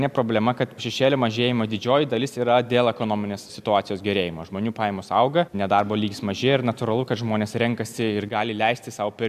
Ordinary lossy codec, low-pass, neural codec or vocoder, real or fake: AAC, 96 kbps; 14.4 kHz; none; real